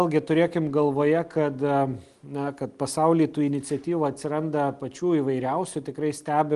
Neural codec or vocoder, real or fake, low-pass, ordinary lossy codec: none; real; 10.8 kHz; Opus, 24 kbps